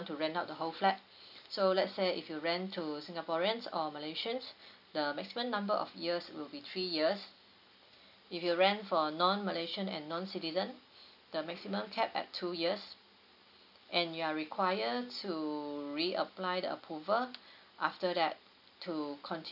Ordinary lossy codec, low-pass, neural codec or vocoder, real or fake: none; 5.4 kHz; none; real